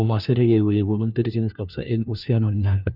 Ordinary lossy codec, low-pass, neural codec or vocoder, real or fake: none; 5.4 kHz; codec, 16 kHz, 1 kbps, FunCodec, trained on LibriTTS, 50 frames a second; fake